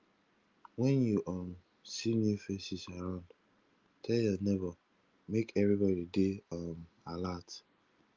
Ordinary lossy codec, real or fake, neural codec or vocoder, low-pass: Opus, 32 kbps; real; none; 7.2 kHz